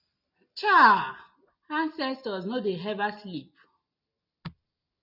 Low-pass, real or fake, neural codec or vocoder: 5.4 kHz; real; none